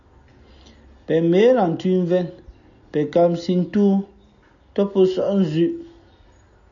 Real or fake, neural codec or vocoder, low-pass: real; none; 7.2 kHz